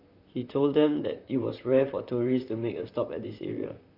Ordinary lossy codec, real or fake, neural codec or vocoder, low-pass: none; fake; vocoder, 44.1 kHz, 128 mel bands, Pupu-Vocoder; 5.4 kHz